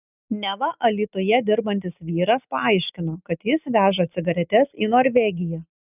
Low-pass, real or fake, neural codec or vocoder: 3.6 kHz; real; none